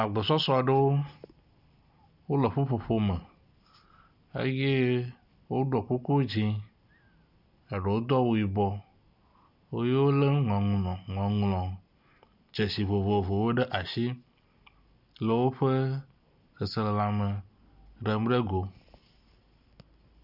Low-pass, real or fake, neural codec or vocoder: 5.4 kHz; real; none